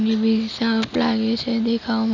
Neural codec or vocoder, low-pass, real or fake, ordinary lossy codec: none; 7.2 kHz; real; none